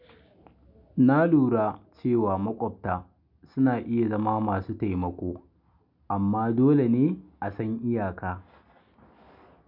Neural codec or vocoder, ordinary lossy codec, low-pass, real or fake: none; none; 5.4 kHz; real